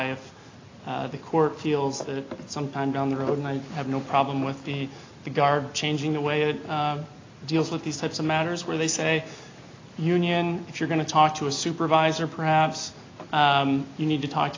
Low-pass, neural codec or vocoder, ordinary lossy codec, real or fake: 7.2 kHz; none; AAC, 32 kbps; real